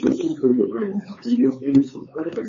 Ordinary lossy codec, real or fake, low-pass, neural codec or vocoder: MP3, 32 kbps; fake; 7.2 kHz; codec, 16 kHz, 4 kbps, X-Codec, HuBERT features, trained on LibriSpeech